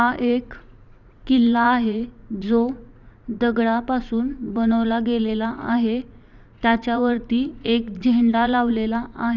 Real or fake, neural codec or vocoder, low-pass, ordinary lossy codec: fake; vocoder, 44.1 kHz, 80 mel bands, Vocos; 7.2 kHz; none